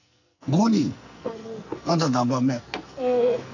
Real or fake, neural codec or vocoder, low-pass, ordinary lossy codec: fake; codec, 44.1 kHz, 2.6 kbps, SNAC; 7.2 kHz; none